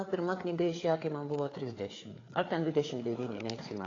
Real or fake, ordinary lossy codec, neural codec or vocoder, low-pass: fake; AAC, 32 kbps; codec, 16 kHz, 4 kbps, FreqCodec, larger model; 7.2 kHz